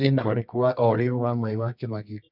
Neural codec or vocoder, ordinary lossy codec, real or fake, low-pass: codec, 24 kHz, 0.9 kbps, WavTokenizer, medium music audio release; none; fake; 5.4 kHz